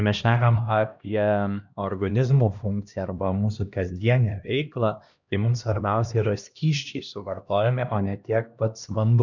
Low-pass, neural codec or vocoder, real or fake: 7.2 kHz; codec, 16 kHz, 1 kbps, X-Codec, HuBERT features, trained on LibriSpeech; fake